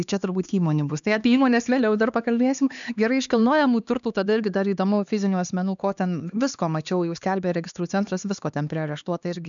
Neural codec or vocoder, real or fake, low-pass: codec, 16 kHz, 2 kbps, X-Codec, HuBERT features, trained on LibriSpeech; fake; 7.2 kHz